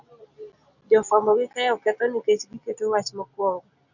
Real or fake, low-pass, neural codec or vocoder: real; 7.2 kHz; none